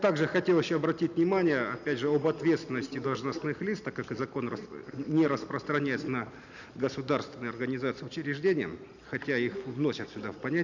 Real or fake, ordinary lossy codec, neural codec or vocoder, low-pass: real; none; none; 7.2 kHz